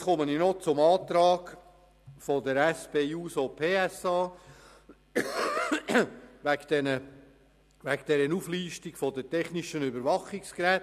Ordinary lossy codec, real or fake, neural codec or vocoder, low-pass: none; real; none; 14.4 kHz